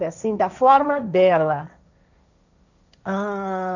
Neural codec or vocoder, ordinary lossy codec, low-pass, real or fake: codec, 16 kHz, 1.1 kbps, Voila-Tokenizer; none; 7.2 kHz; fake